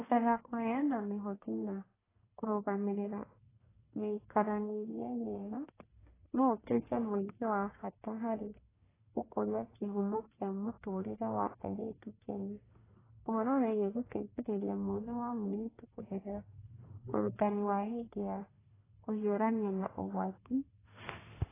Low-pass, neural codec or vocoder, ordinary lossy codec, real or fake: 3.6 kHz; codec, 44.1 kHz, 1.7 kbps, Pupu-Codec; AAC, 16 kbps; fake